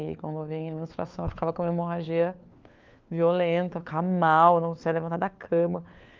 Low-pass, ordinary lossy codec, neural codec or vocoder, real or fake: 7.2 kHz; Opus, 24 kbps; codec, 16 kHz, 4 kbps, FunCodec, trained on LibriTTS, 50 frames a second; fake